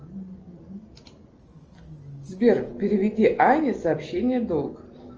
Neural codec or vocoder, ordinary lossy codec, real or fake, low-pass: none; Opus, 24 kbps; real; 7.2 kHz